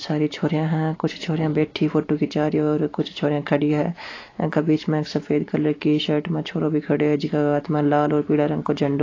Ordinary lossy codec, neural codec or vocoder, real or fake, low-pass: AAC, 32 kbps; none; real; 7.2 kHz